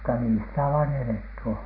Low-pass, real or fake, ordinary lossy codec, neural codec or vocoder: 5.4 kHz; real; MP3, 32 kbps; none